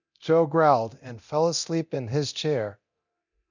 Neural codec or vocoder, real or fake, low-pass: codec, 24 kHz, 0.9 kbps, DualCodec; fake; 7.2 kHz